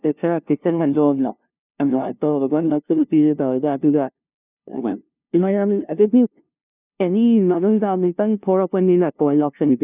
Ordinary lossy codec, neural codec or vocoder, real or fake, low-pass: none; codec, 16 kHz, 0.5 kbps, FunCodec, trained on LibriTTS, 25 frames a second; fake; 3.6 kHz